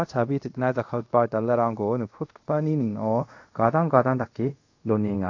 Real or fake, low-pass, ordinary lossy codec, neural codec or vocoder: fake; 7.2 kHz; AAC, 48 kbps; codec, 24 kHz, 0.5 kbps, DualCodec